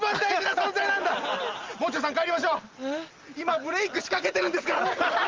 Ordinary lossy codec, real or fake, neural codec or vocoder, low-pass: Opus, 32 kbps; real; none; 7.2 kHz